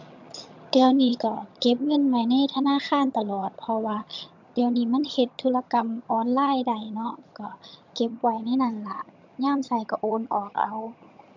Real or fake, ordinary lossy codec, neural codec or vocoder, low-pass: fake; MP3, 64 kbps; vocoder, 22.05 kHz, 80 mel bands, HiFi-GAN; 7.2 kHz